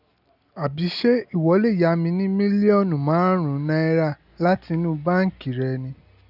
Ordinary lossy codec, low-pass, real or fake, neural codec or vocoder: none; 5.4 kHz; real; none